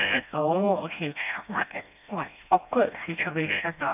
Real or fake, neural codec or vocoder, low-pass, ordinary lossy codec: fake; codec, 16 kHz, 1 kbps, FreqCodec, smaller model; 3.6 kHz; none